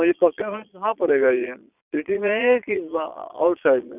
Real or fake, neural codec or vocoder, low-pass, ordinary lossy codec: fake; vocoder, 22.05 kHz, 80 mel bands, Vocos; 3.6 kHz; none